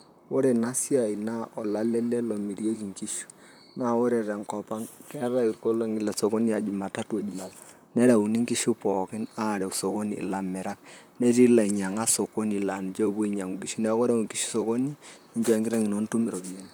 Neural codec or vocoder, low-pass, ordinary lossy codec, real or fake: vocoder, 44.1 kHz, 128 mel bands, Pupu-Vocoder; none; none; fake